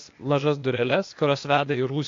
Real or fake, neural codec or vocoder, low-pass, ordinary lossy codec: fake; codec, 16 kHz, 0.8 kbps, ZipCodec; 7.2 kHz; AAC, 48 kbps